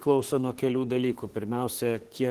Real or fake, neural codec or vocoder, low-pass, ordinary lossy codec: fake; autoencoder, 48 kHz, 32 numbers a frame, DAC-VAE, trained on Japanese speech; 14.4 kHz; Opus, 16 kbps